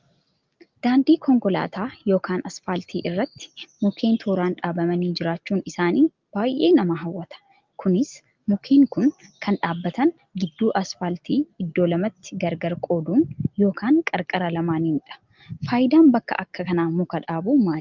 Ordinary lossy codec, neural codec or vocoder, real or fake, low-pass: Opus, 32 kbps; none; real; 7.2 kHz